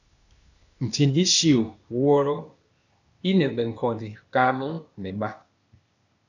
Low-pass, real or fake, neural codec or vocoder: 7.2 kHz; fake; codec, 16 kHz, 0.8 kbps, ZipCodec